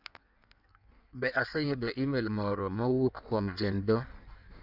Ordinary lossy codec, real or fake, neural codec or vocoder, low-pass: none; fake; codec, 16 kHz in and 24 kHz out, 1.1 kbps, FireRedTTS-2 codec; 5.4 kHz